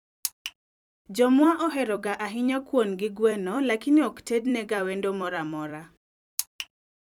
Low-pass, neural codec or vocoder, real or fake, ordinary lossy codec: 19.8 kHz; vocoder, 44.1 kHz, 128 mel bands every 256 samples, BigVGAN v2; fake; none